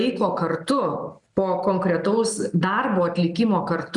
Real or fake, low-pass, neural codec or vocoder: real; 10.8 kHz; none